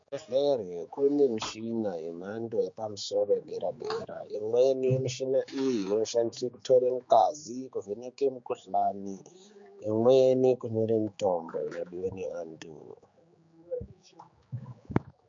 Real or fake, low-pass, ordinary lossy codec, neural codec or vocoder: fake; 7.2 kHz; MP3, 48 kbps; codec, 16 kHz, 4 kbps, X-Codec, HuBERT features, trained on general audio